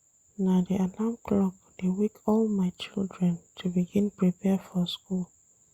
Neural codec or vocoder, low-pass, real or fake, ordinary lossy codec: none; 19.8 kHz; real; Opus, 64 kbps